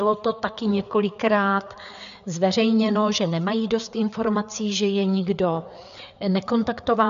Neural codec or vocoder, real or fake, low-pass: codec, 16 kHz, 4 kbps, FreqCodec, larger model; fake; 7.2 kHz